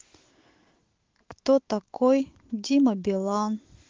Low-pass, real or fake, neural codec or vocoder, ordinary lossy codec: 7.2 kHz; fake; autoencoder, 48 kHz, 128 numbers a frame, DAC-VAE, trained on Japanese speech; Opus, 16 kbps